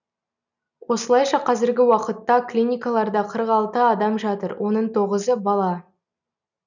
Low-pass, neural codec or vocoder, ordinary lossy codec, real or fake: 7.2 kHz; none; none; real